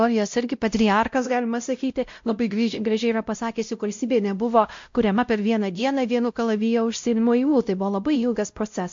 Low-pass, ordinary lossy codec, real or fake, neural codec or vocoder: 7.2 kHz; MP3, 48 kbps; fake; codec, 16 kHz, 0.5 kbps, X-Codec, WavLM features, trained on Multilingual LibriSpeech